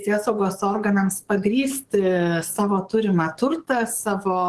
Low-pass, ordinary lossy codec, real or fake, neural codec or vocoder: 10.8 kHz; Opus, 16 kbps; fake; codec, 44.1 kHz, 7.8 kbps, DAC